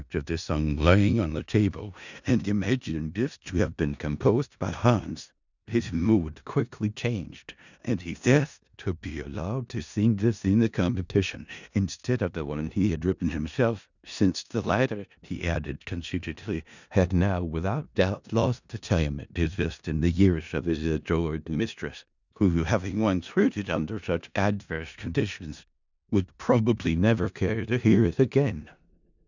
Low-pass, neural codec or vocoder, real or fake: 7.2 kHz; codec, 16 kHz in and 24 kHz out, 0.4 kbps, LongCat-Audio-Codec, four codebook decoder; fake